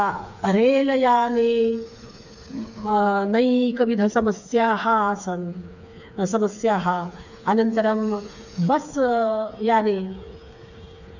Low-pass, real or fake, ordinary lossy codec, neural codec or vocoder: 7.2 kHz; fake; none; codec, 16 kHz, 4 kbps, FreqCodec, smaller model